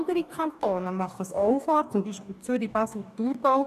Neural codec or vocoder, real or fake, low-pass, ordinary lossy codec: codec, 44.1 kHz, 2.6 kbps, DAC; fake; 14.4 kHz; none